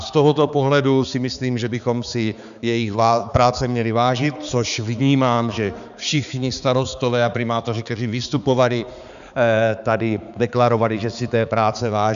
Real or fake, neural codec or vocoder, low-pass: fake; codec, 16 kHz, 4 kbps, X-Codec, HuBERT features, trained on balanced general audio; 7.2 kHz